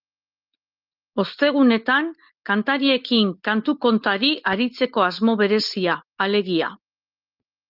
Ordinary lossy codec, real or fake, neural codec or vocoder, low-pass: Opus, 32 kbps; real; none; 5.4 kHz